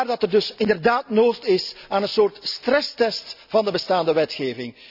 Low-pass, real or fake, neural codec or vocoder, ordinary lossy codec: 5.4 kHz; real; none; none